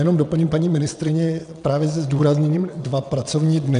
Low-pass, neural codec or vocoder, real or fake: 9.9 kHz; vocoder, 22.05 kHz, 80 mel bands, WaveNeXt; fake